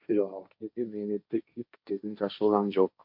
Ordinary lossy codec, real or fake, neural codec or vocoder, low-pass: MP3, 48 kbps; fake; codec, 16 kHz, 1.1 kbps, Voila-Tokenizer; 5.4 kHz